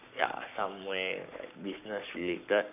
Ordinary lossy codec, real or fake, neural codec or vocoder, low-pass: none; fake; codec, 44.1 kHz, 7.8 kbps, Pupu-Codec; 3.6 kHz